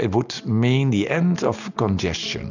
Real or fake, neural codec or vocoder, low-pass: real; none; 7.2 kHz